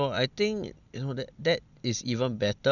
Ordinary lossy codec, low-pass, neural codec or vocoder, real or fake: none; 7.2 kHz; none; real